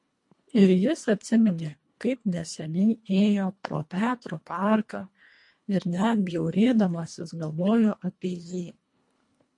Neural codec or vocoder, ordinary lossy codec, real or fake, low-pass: codec, 24 kHz, 1.5 kbps, HILCodec; MP3, 48 kbps; fake; 10.8 kHz